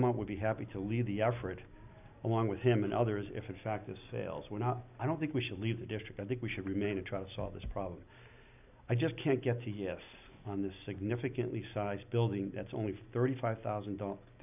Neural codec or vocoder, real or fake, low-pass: none; real; 3.6 kHz